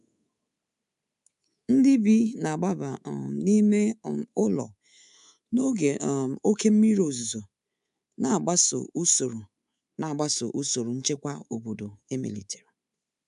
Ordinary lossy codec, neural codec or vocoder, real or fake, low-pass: none; codec, 24 kHz, 3.1 kbps, DualCodec; fake; 10.8 kHz